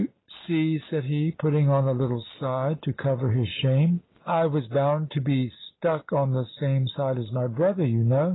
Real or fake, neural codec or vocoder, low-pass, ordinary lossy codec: real; none; 7.2 kHz; AAC, 16 kbps